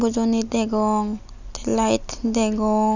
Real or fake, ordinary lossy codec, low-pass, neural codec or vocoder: real; none; 7.2 kHz; none